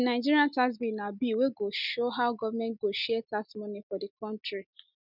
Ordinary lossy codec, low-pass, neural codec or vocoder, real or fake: none; 5.4 kHz; none; real